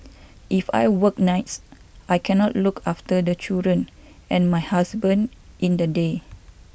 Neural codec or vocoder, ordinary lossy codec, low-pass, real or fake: none; none; none; real